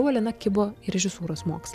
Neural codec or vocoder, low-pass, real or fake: none; 14.4 kHz; real